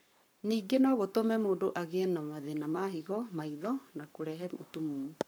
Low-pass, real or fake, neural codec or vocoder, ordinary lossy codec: none; fake; codec, 44.1 kHz, 7.8 kbps, Pupu-Codec; none